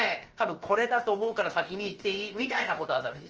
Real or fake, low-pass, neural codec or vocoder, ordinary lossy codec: fake; 7.2 kHz; codec, 16 kHz, about 1 kbps, DyCAST, with the encoder's durations; Opus, 24 kbps